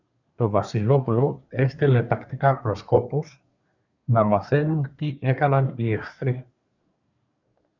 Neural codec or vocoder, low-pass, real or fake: codec, 24 kHz, 1 kbps, SNAC; 7.2 kHz; fake